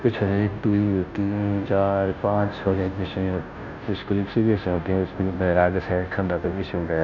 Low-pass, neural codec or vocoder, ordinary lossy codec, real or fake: 7.2 kHz; codec, 16 kHz, 0.5 kbps, FunCodec, trained on Chinese and English, 25 frames a second; none; fake